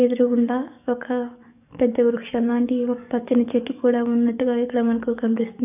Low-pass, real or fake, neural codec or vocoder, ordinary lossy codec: 3.6 kHz; fake; codec, 44.1 kHz, 7.8 kbps, DAC; none